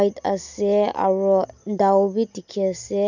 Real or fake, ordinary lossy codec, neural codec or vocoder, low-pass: real; none; none; 7.2 kHz